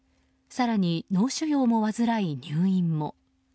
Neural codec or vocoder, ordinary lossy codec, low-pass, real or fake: none; none; none; real